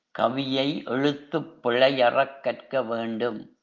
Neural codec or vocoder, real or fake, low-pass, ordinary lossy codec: none; real; 7.2 kHz; Opus, 24 kbps